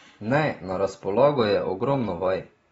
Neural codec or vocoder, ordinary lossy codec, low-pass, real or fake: none; AAC, 24 kbps; 10.8 kHz; real